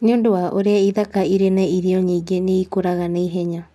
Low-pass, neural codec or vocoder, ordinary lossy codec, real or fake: none; none; none; real